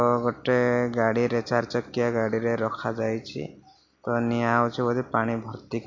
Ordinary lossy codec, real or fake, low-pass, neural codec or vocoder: MP3, 48 kbps; real; 7.2 kHz; none